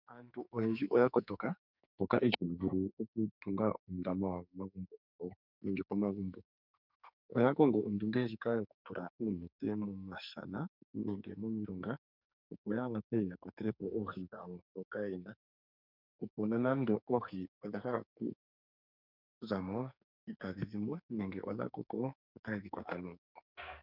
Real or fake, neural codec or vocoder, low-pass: fake; codec, 44.1 kHz, 2.6 kbps, SNAC; 5.4 kHz